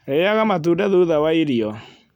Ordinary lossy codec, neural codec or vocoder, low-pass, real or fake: none; none; 19.8 kHz; real